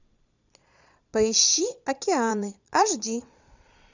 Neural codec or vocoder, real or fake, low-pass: vocoder, 22.05 kHz, 80 mel bands, Vocos; fake; 7.2 kHz